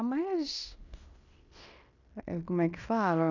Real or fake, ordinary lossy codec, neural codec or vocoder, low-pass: fake; none; codec, 16 kHz in and 24 kHz out, 0.9 kbps, LongCat-Audio-Codec, fine tuned four codebook decoder; 7.2 kHz